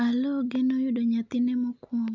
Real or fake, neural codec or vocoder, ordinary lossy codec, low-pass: real; none; none; 7.2 kHz